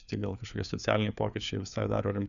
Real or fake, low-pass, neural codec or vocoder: fake; 7.2 kHz; codec, 16 kHz, 4 kbps, FreqCodec, larger model